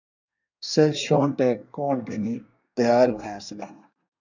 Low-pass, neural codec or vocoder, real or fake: 7.2 kHz; codec, 24 kHz, 1 kbps, SNAC; fake